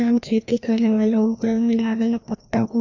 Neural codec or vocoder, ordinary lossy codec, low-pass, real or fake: codec, 16 kHz, 1 kbps, FreqCodec, larger model; none; 7.2 kHz; fake